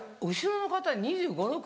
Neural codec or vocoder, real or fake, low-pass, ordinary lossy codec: none; real; none; none